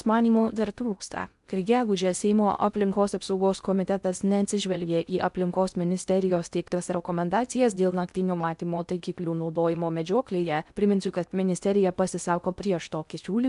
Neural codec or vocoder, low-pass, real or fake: codec, 16 kHz in and 24 kHz out, 0.6 kbps, FocalCodec, streaming, 2048 codes; 10.8 kHz; fake